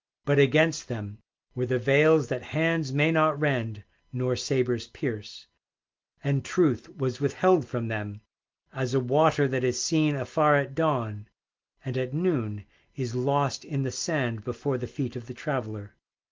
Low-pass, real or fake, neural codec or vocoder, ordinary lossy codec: 7.2 kHz; real; none; Opus, 32 kbps